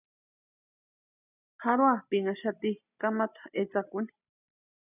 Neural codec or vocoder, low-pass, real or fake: none; 3.6 kHz; real